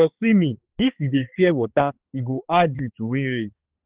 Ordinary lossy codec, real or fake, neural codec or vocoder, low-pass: Opus, 16 kbps; fake; codec, 16 kHz, 2 kbps, X-Codec, HuBERT features, trained on balanced general audio; 3.6 kHz